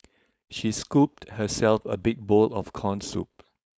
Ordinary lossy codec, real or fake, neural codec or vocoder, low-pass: none; fake; codec, 16 kHz, 4.8 kbps, FACodec; none